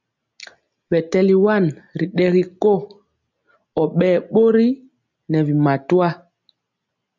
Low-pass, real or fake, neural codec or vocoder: 7.2 kHz; real; none